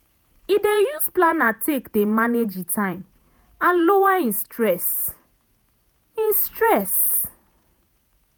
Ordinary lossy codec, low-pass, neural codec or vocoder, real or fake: none; none; vocoder, 48 kHz, 128 mel bands, Vocos; fake